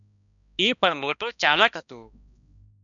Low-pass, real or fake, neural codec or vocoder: 7.2 kHz; fake; codec, 16 kHz, 1 kbps, X-Codec, HuBERT features, trained on balanced general audio